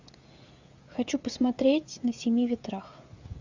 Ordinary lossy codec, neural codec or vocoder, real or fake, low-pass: Opus, 64 kbps; codec, 16 kHz, 16 kbps, FreqCodec, smaller model; fake; 7.2 kHz